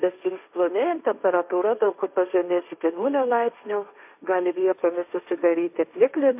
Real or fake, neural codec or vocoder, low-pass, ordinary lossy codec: fake; codec, 16 kHz, 1.1 kbps, Voila-Tokenizer; 3.6 kHz; MP3, 32 kbps